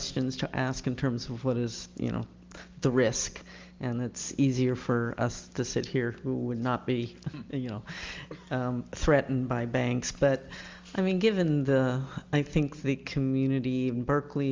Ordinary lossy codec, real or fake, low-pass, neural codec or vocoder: Opus, 32 kbps; real; 7.2 kHz; none